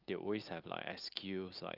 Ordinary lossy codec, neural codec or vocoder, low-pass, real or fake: Opus, 24 kbps; none; 5.4 kHz; real